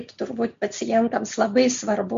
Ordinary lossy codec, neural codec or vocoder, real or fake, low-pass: MP3, 48 kbps; none; real; 7.2 kHz